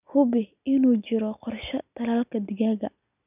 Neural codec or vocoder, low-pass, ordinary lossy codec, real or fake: none; 3.6 kHz; none; real